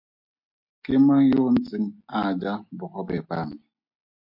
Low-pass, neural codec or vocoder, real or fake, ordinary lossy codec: 5.4 kHz; none; real; MP3, 32 kbps